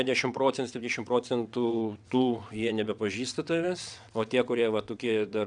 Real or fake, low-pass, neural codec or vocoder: fake; 9.9 kHz; vocoder, 22.05 kHz, 80 mel bands, WaveNeXt